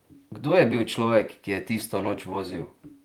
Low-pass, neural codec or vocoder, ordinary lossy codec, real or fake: 19.8 kHz; vocoder, 44.1 kHz, 128 mel bands, Pupu-Vocoder; Opus, 32 kbps; fake